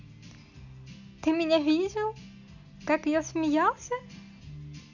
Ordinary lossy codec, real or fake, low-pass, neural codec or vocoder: none; real; 7.2 kHz; none